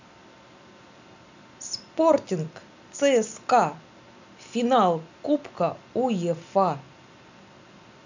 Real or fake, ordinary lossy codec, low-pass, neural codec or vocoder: real; none; 7.2 kHz; none